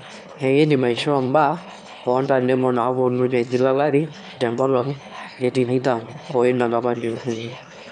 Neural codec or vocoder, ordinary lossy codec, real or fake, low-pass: autoencoder, 22.05 kHz, a latent of 192 numbers a frame, VITS, trained on one speaker; none; fake; 9.9 kHz